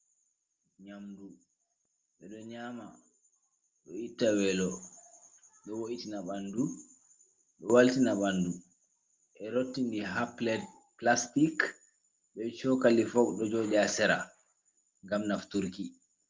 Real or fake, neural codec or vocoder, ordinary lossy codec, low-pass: real; none; Opus, 32 kbps; 7.2 kHz